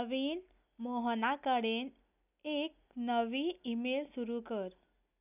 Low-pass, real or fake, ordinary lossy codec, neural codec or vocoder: 3.6 kHz; real; none; none